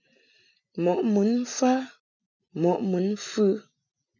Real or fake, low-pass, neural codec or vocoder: real; 7.2 kHz; none